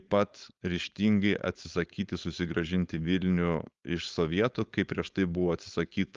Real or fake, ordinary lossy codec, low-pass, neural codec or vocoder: fake; Opus, 32 kbps; 7.2 kHz; codec, 16 kHz, 4.8 kbps, FACodec